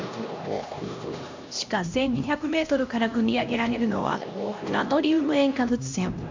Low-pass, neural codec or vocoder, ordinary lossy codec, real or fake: 7.2 kHz; codec, 16 kHz, 1 kbps, X-Codec, HuBERT features, trained on LibriSpeech; MP3, 64 kbps; fake